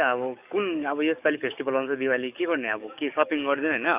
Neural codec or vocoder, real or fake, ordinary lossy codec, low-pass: codec, 44.1 kHz, 7.8 kbps, DAC; fake; none; 3.6 kHz